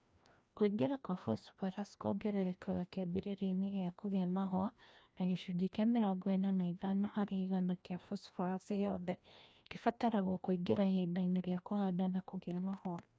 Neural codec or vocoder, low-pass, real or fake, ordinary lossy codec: codec, 16 kHz, 1 kbps, FreqCodec, larger model; none; fake; none